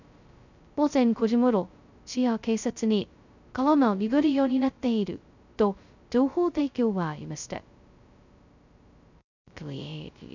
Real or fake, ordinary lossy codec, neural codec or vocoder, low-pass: fake; none; codec, 16 kHz, 0.2 kbps, FocalCodec; 7.2 kHz